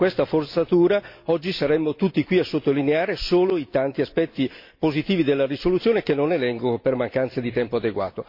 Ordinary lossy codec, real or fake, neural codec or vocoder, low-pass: MP3, 32 kbps; real; none; 5.4 kHz